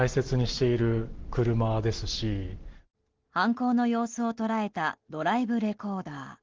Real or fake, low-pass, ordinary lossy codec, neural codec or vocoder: real; 7.2 kHz; Opus, 16 kbps; none